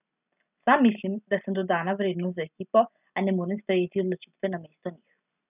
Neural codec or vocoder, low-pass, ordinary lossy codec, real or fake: none; 3.6 kHz; none; real